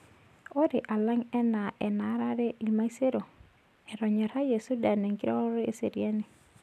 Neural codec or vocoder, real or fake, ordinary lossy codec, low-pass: none; real; none; 14.4 kHz